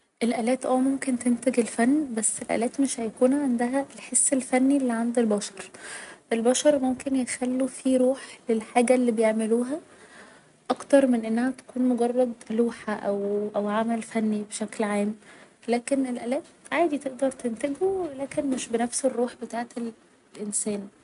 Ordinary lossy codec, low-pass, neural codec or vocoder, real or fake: none; 10.8 kHz; none; real